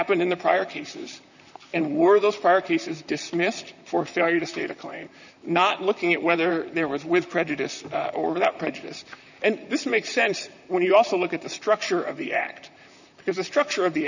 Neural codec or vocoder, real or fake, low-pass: vocoder, 44.1 kHz, 128 mel bands, Pupu-Vocoder; fake; 7.2 kHz